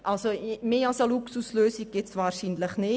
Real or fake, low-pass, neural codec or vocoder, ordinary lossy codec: real; none; none; none